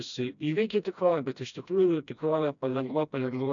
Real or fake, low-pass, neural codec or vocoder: fake; 7.2 kHz; codec, 16 kHz, 1 kbps, FreqCodec, smaller model